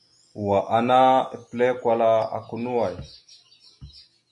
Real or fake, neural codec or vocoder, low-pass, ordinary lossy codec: real; none; 10.8 kHz; MP3, 96 kbps